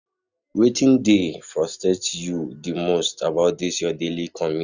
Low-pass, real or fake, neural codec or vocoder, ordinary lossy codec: 7.2 kHz; real; none; none